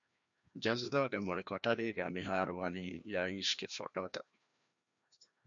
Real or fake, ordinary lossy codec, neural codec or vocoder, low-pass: fake; MP3, 64 kbps; codec, 16 kHz, 1 kbps, FreqCodec, larger model; 7.2 kHz